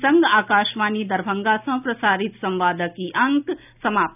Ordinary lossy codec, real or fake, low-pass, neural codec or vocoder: none; real; 3.6 kHz; none